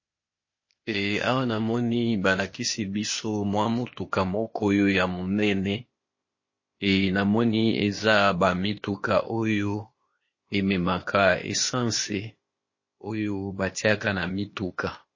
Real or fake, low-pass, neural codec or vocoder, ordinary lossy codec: fake; 7.2 kHz; codec, 16 kHz, 0.8 kbps, ZipCodec; MP3, 32 kbps